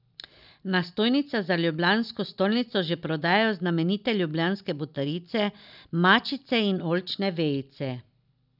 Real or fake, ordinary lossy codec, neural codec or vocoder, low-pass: real; none; none; 5.4 kHz